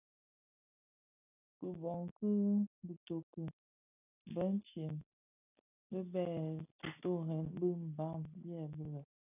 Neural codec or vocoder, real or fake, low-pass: none; real; 3.6 kHz